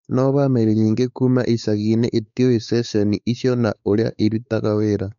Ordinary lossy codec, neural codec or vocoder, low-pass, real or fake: none; codec, 16 kHz, 4 kbps, X-Codec, WavLM features, trained on Multilingual LibriSpeech; 7.2 kHz; fake